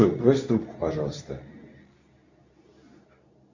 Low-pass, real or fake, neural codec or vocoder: 7.2 kHz; fake; codec, 24 kHz, 0.9 kbps, WavTokenizer, medium speech release version 2